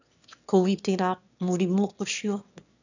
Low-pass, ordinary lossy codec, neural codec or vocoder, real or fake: 7.2 kHz; none; autoencoder, 22.05 kHz, a latent of 192 numbers a frame, VITS, trained on one speaker; fake